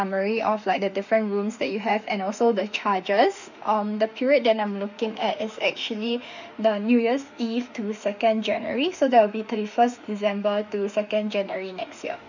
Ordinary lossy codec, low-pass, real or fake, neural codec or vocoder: none; 7.2 kHz; fake; autoencoder, 48 kHz, 32 numbers a frame, DAC-VAE, trained on Japanese speech